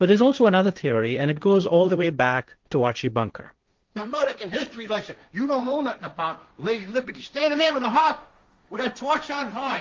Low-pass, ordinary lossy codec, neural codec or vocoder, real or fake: 7.2 kHz; Opus, 32 kbps; codec, 16 kHz, 1.1 kbps, Voila-Tokenizer; fake